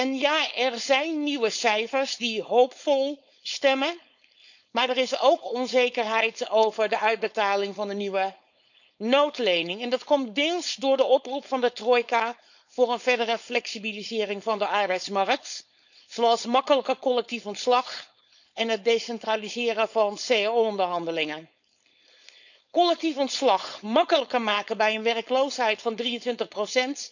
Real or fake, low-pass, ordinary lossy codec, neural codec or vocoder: fake; 7.2 kHz; none; codec, 16 kHz, 4.8 kbps, FACodec